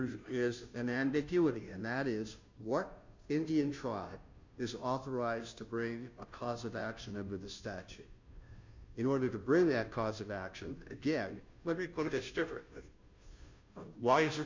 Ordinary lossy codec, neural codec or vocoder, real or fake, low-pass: MP3, 64 kbps; codec, 16 kHz, 0.5 kbps, FunCodec, trained on Chinese and English, 25 frames a second; fake; 7.2 kHz